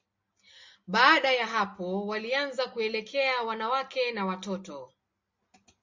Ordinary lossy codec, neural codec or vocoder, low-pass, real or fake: MP3, 64 kbps; none; 7.2 kHz; real